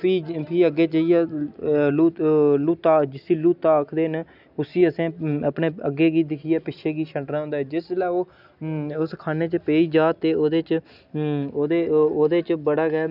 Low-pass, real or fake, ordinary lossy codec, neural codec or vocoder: 5.4 kHz; real; none; none